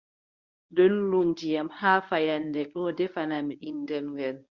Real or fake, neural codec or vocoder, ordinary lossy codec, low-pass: fake; codec, 24 kHz, 0.9 kbps, WavTokenizer, medium speech release version 1; Opus, 64 kbps; 7.2 kHz